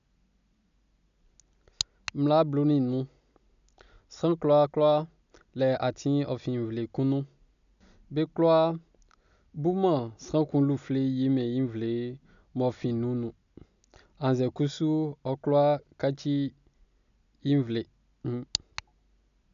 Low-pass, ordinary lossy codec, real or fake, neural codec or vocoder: 7.2 kHz; none; real; none